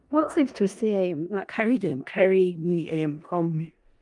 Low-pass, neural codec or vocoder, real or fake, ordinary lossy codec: 10.8 kHz; codec, 16 kHz in and 24 kHz out, 0.4 kbps, LongCat-Audio-Codec, four codebook decoder; fake; Opus, 32 kbps